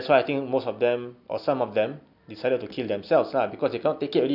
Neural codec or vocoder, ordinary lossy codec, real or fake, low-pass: none; none; real; 5.4 kHz